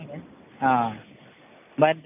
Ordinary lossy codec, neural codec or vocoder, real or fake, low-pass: none; none; real; 3.6 kHz